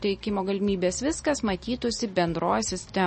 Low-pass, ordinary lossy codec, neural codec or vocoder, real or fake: 10.8 kHz; MP3, 32 kbps; none; real